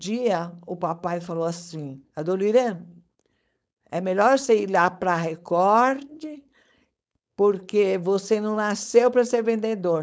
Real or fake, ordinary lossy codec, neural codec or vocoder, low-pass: fake; none; codec, 16 kHz, 4.8 kbps, FACodec; none